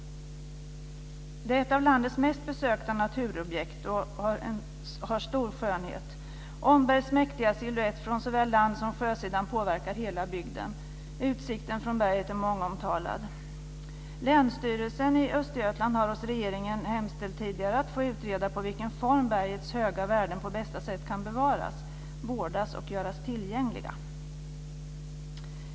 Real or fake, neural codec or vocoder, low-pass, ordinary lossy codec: real; none; none; none